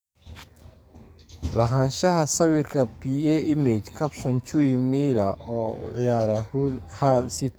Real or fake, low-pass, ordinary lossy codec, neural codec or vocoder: fake; none; none; codec, 44.1 kHz, 2.6 kbps, SNAC